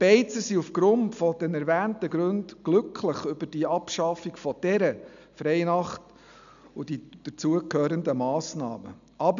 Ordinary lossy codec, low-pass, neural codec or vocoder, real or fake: none; 7.2 kHz; none; real